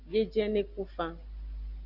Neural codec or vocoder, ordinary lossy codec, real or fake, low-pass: none; Opus, 64 kbps; real; 5.4 kHz